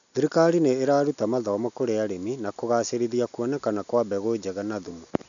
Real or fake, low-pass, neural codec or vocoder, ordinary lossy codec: real; 7.2 kHz; none; none